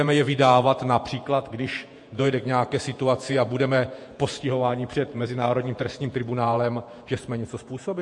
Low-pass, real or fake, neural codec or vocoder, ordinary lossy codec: 10.8 kHz; fake; vocoder, 48 kHz, 128 mel bands, Vocos; MP3, 48 kbps